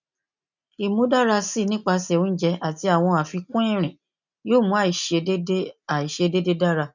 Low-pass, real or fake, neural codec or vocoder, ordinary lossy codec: 7.2 kHz; real; none; none